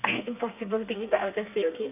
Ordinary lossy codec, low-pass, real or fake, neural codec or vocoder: none; 3.6 kHz; fake; codec, 32 kHz, 1.9 kbps, SNAC